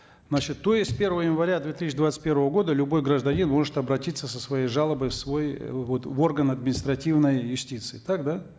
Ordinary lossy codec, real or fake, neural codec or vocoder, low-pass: none; real; none; none